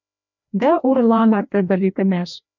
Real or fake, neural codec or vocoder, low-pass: fake; codec, 16 kHz, 1 kbps, FreqCodec, larger model; 7.2 kHz